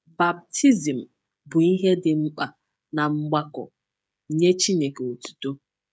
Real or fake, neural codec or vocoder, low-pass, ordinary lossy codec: fake; codec, 16 kHz, 16 kbps, FreqCodec, smaller model; none; none